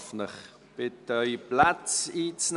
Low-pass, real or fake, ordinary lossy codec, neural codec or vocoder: 10.8 kHz; real; none; none